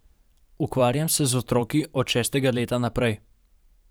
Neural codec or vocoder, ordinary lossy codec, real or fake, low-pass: vocoder, 44.1 kHz, 128 mel bands every 512 samples, BigVGAN v2; none; fake; none